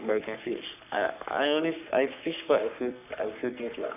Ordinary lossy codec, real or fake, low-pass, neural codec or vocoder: none; fake; 3.6 kHz; codec, 44.1 kHz, 3.4 kbps, Pupu-Codec